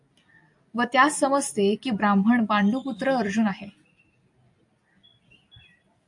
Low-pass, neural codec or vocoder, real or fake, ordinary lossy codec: 10.8 kHz; none; real; AAC, 64 kbps